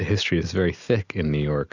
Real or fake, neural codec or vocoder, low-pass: real; none; 7.2 kHz